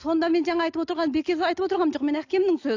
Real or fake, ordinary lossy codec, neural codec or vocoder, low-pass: real; AAC, 48 kbps; none; 7.2 kHz